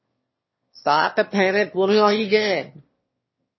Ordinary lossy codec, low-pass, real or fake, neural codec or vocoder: MP3, 24 kbps; 7.2 kHz; fake; autoencoder, 22.05 kHz, a latent of 192 numbers a frame, VITS, trained on one speaker